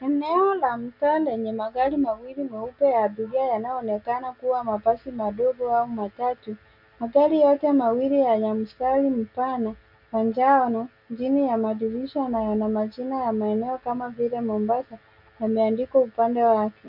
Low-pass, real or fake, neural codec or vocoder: 5.4 kHz; real; none